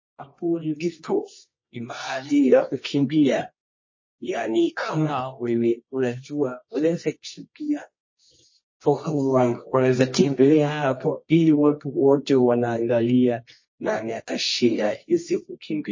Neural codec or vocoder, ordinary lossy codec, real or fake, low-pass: codec, 24 kHz, 0.9 kbps, WavTokenizer, medium music audio release; MP3, 32 kbps; fake; 7.2 kHz